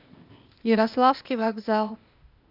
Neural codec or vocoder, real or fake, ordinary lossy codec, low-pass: codec, 16 kHz, 0.8 kbps, ZipCodec; fake; AAC, 48 kbps; 5.4 kHz